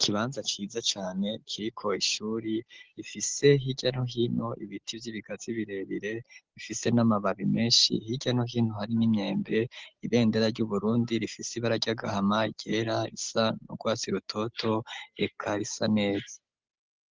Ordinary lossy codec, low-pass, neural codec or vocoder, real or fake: Opus, 16 kbps; 7.2 kHz; none; real